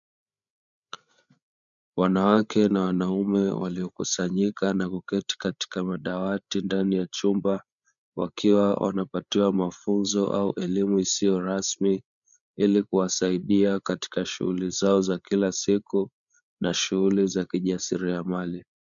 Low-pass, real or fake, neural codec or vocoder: 7.2 kHz; fake; codec, 16 kHz, 8 kbps, FreqCodec, larger model